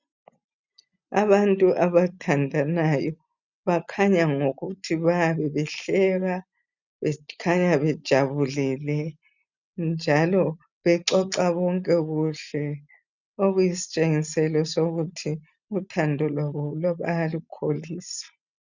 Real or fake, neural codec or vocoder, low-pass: real; none; 7.2 kHz